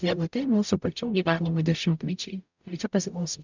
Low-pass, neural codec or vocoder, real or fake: 7.2 kHz; codec, 44.1 kHz, 0.9 kbps, DAC; fake